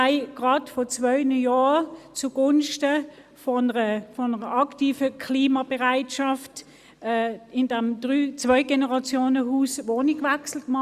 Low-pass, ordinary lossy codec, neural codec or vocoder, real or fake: 14.4 kHz; Opus, 64 kbps; none; real